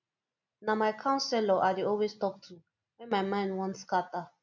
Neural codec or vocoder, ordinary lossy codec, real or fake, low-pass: none; none; real; 7.2 kHz